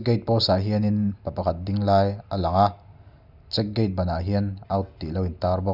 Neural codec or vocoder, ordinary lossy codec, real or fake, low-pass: none; none; real; 5.4 kHz